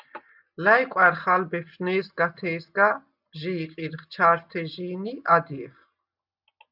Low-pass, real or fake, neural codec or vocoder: 5.4 kHz; real; none